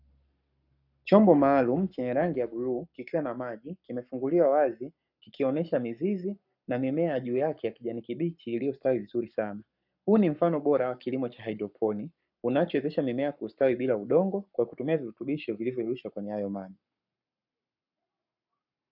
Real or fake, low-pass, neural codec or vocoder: fake; 5.4 kHz; codec, 44.1 kHz, 7.8 kbps, Pupu-Codec